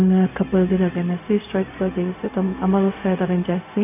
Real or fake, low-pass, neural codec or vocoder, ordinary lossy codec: fake; 3.6 kHz; codec, 16 kHz, 0.4 kbps, LongCat-Audio-Codec; none